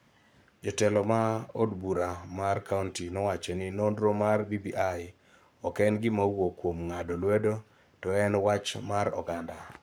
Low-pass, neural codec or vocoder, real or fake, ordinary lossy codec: none; codec, 44.1 kHz, 7.8 kbps, Pupu-Codec; fake; none